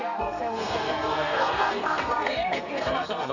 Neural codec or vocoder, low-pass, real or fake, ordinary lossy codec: codec, 32 kHz, 1.9 kbps, SNAC; 7.2 kHz; fake; none